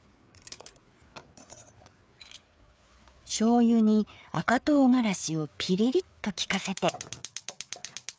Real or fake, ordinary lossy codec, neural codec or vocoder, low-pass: fake; none; codec, 16 kHz, 4 kbps, FreqCodec, larger model; none